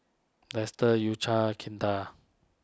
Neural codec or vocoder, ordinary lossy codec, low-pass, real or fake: none; none; none; real